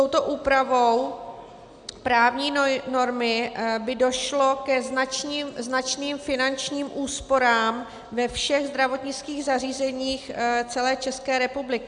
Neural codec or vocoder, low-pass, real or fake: none; 9.9 kHz; real